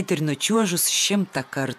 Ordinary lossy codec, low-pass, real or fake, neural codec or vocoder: MP3, 96 kbps; 14.4 kHz; fake; vocoder, 48 kHz, 128 mel bands, Vocos